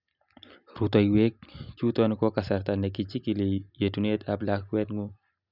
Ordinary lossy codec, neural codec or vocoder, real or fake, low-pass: none; none; real; 5.4 kHz